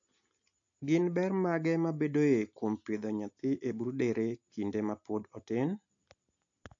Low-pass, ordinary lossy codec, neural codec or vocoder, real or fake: 7.2 kHz; AAC, 48 kbps; none; real